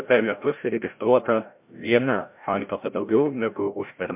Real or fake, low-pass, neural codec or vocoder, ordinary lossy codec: fake; 3.6 kHz; codec, 16 kHz, 0.5 kbps, FreqCodec, larger model; MP3, 32 kbps